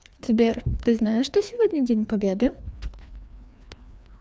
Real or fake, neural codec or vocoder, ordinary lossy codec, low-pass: fake; codec, 16 kHz, 2 kbps, FreqCodec, larger model; none; none